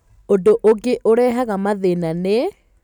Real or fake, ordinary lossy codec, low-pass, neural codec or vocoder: real; none; 19.8 kHz; none